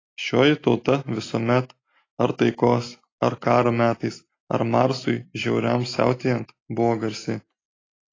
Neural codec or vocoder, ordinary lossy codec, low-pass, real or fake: none; AAC, 32 kbps; 7.2 kHz; real